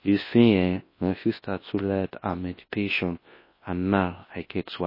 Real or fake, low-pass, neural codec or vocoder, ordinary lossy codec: fake; 5.4 kHz; codec, 24 kHz, 0.9 kbps, WavTokenizer, large speech release; MP3, 24 kbps